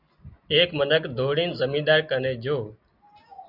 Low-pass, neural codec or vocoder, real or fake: 5.4 kHz; none; real